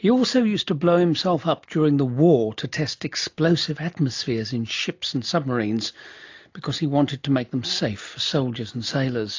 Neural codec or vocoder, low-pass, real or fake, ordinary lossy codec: none; 7.2 kHz; real; AAC, 48 kbps